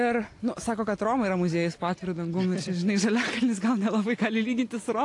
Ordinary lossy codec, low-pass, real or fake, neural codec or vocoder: AAC, 48 kbps; 10.8 kHz; real; none